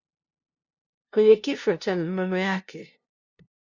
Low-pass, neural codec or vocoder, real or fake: 7.2 kHz; codec, 16 kHz, 0.5 kbps, FunCodec, trained on LibriTTS, 25 frames a second; fake